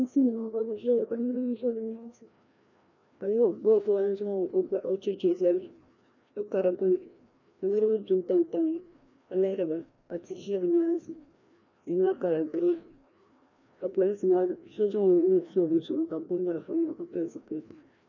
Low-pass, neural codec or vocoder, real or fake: 7.2 kHz; codec, 16 kHz, 1 kbps, FreqCodec, larger model; fake